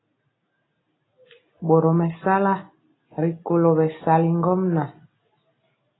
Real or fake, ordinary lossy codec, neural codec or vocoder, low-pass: real; AAC, 16 kbps; none; 7.2 kHz